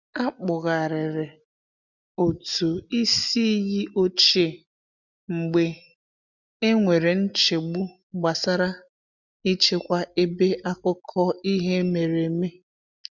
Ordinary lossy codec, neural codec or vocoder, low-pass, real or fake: none; none; 7.2 kHz; real